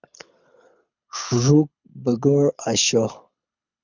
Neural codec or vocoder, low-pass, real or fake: codec, 24 kHz, 6 kbps, HILCodec; 7.2 kHz; fake